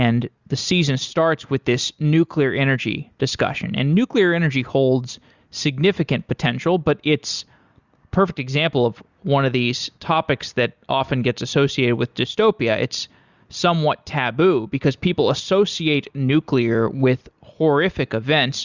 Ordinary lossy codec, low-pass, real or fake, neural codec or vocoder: Opus, 64 kbps; 7.2 kHz; real; none